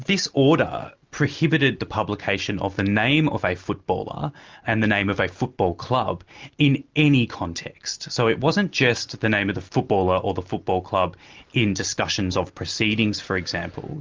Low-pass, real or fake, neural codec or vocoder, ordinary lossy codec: 7.2 kHz; real; none; Opus, 32 kbps